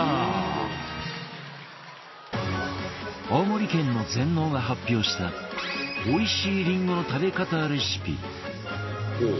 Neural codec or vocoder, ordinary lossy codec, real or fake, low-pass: none; MP3, 24 kbps; real; 7.2 kHz